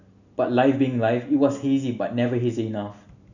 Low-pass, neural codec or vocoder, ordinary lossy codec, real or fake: 7.2 kHz; none; none; real